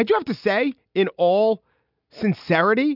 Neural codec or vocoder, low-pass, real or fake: none; 5.4 kHz; real